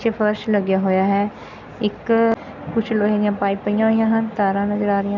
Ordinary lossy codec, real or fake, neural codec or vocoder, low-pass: none; real; none; 7.2 kHz